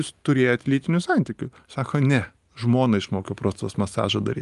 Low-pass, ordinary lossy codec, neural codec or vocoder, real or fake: 10.8 kHz; Opus, 32 kbps; none; real